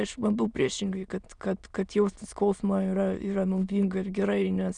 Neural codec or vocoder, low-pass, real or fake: autoencoder, 22.05 kHz, a latent of 192 numbers a frame, VITS, trained on many speakers; 9.9 kHz; fake